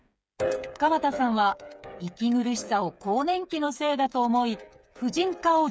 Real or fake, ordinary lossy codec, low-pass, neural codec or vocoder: fake; none; none; codec, 16 kHz, 8 kbps, FreqCodec, smaller model